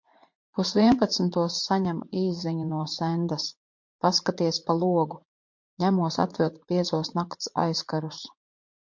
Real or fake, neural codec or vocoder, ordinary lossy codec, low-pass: fake; vocoder, 44.1 kHz, 80 mel bands, Vocos; MP3, 48 kbps; 7.2 kHz